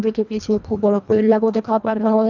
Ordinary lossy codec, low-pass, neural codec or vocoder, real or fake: none; 7.2 kHz; codec, 24 kHz, 1.5 kbps, HILCodec; fake